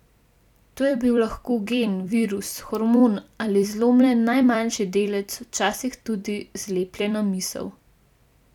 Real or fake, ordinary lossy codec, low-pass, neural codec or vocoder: fake; none; 19.8 kHz; vocoder, 44.1 kHz, 128 mel bands every 256 samples, BigVGAN v2